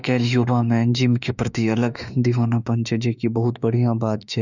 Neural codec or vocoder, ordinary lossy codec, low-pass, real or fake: autoencoder, 48 kHz, 32 numbers a frame, DAC-VAE, trained on Japanese speech; none; 7.2 kHz; fake